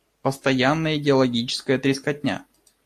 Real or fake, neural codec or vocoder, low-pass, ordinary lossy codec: real; none; 14.4 kHz; AAC, 64 kbps